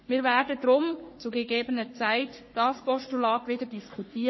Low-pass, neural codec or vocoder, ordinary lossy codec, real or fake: 7.2 kHz; codec, 44.1 kHz, 3.4 kbps, Pupu-Codec; MP3, 24 kbps; fake